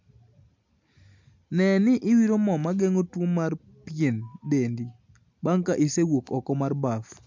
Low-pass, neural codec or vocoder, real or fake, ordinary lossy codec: 7.2 kHz; none; real; MP3, 64 kbps